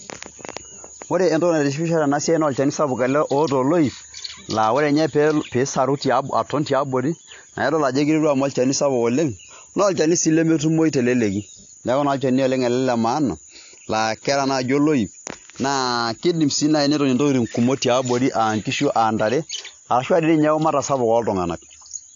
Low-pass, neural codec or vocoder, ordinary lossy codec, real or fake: 7.2 kHz; none; AAC, 48 kbps; real